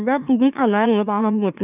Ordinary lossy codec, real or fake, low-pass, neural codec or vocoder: none; fake; 3.6 kHz; autoencoder, 44.1 kHz, a latent of 192 numbers a frame, MeloTTS